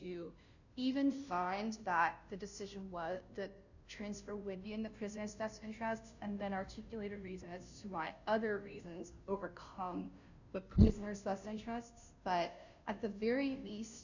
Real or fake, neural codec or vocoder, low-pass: fake; codec, 16 kHz, 0.5 kbps, FunCodec, trained on Chinese and English, 25 frames a second; 7.2 kHz